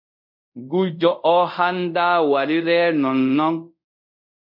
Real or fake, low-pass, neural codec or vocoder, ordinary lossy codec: fake; 5.4 kHz; codec, 24 kHz, 0.5 kbps, DualCodec; MP3, 48 kbps